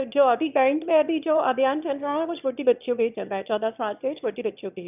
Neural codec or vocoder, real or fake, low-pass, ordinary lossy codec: autoencoder, 22.05 kHz, a latent of 192 numbers a frame, VITS, trained on one speaker; fake; 3.6 kHz; none